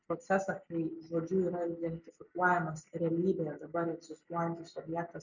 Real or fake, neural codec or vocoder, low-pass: real; none; 7.2 kHz